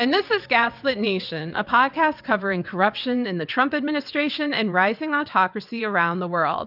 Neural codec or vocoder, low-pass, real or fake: vocoder, 22.05 kHz, 80 mel bands, WaveNeXt; 5.4 kHz; fake